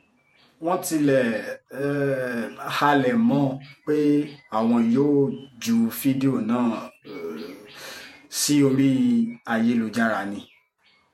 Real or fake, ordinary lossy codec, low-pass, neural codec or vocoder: fake; MP3, 64 kbps; 19.8 kHz; vocoder, 48 kHz, 128 mel bands, Vocos